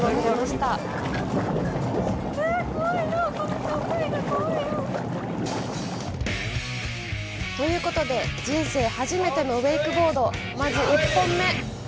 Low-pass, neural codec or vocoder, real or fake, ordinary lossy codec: none; none; real; none